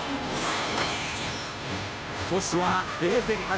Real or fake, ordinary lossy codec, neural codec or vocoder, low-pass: fake; none; codec, 16 kHz, 0.5 kbps, FunCodec, trained on Chinese and English, 25 frames a second; none